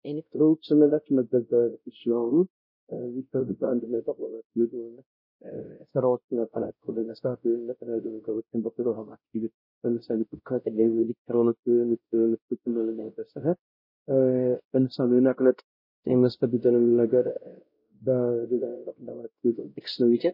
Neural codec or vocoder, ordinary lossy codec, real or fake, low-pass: codec, 16 kHz, 0.5 kbps, X-Codec, WavLM features, trained on Multilingual LibriSpeech; MP3, 32 kbps; fake; 5.4 kHz